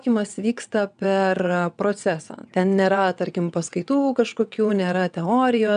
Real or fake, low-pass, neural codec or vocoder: fake; 9.9 kHz; vocoder, 22.05 kHz, 80 mel bands, WaveNeXt